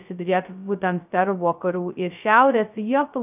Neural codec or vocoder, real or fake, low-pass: codec, 16 kHz, 0.2 kbps, FocalCodec; fake; 3.6 kHz